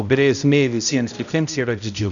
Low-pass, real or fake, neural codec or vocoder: 7.2 kHz; fake; codec, 16 kHz, 0.5 kbps, X-Codec, HuBERT features, trained on balanced general audio